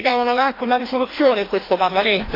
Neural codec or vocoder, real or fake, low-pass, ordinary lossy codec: codec, 16 kHz, 1 kbps, FreqCodec, larger model; fake; 5.4 kHz; AAC, 24 kbps